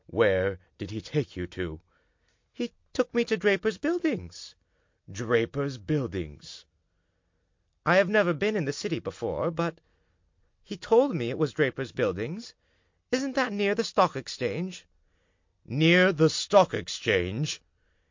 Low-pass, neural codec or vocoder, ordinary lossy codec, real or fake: 7.2 kHz; none; MP3, 48 kbps; real